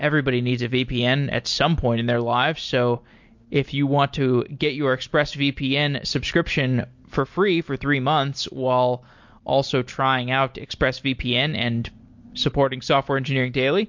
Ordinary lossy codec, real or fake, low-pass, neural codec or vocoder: MP3, 48 kbps; real; 7.2 kHz; none